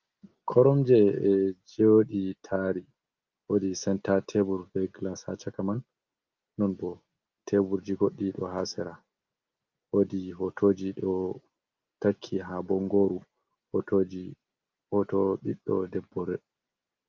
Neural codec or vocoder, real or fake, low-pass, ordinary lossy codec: none; real; 7.2 kHz; Opus, 32 kbps